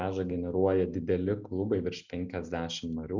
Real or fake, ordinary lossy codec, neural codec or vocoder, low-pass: real; Opus, 64 kbps; none; 7.2 kHz